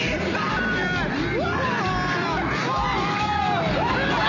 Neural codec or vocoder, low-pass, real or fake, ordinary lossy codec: none; 7.2 kHz; real; none